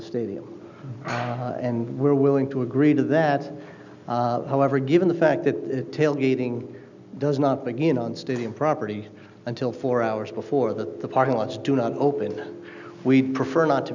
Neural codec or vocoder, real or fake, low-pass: none; real; 7.2 kHz